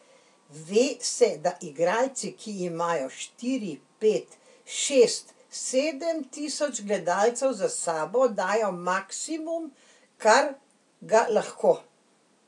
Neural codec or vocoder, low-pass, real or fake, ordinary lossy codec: none; 10.8 kHz; real; AAC, 64 kbps